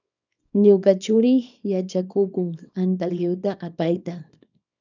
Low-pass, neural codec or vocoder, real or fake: 7.2 kHz; codec, 24 kHz, 0.9 kbps, WavTokenizer, small release; fake